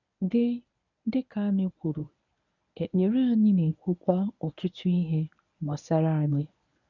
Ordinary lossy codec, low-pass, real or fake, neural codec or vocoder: none; 7.2 kHz; fake; codec, 24 kHz, 0.9 kbps, WavTokenizer, medium speech release version 1